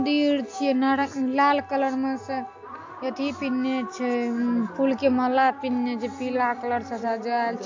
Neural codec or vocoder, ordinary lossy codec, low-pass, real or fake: none; AAC, 48 kbps; 7.2 kHz; real